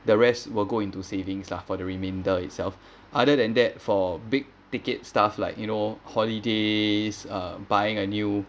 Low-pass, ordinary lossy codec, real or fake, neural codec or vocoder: none; none; real; none